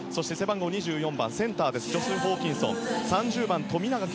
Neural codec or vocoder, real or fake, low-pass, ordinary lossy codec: none; real; none; none